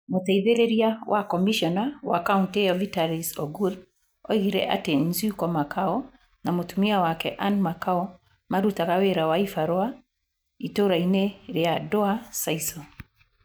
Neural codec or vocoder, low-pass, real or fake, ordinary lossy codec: none; none; real; none